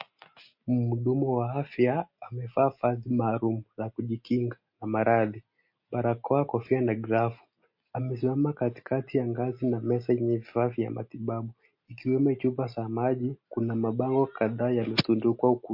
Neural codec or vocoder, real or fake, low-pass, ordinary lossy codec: none; real; 5.4 kHz; MP3, 32 kbps